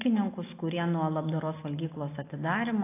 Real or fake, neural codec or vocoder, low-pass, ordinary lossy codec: real; none; 3.6 kHz; AAC, 24 kbps